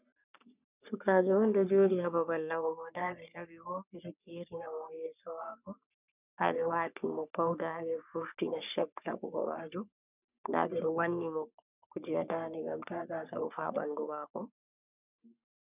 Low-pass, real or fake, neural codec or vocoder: 3.6 kHz; fake; codec, 44.1 kHz, 3.4 kbps, Pupu-Codec